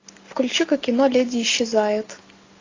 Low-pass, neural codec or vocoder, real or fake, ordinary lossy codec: 7.2 kHz; none; real; AAC, 32 kbps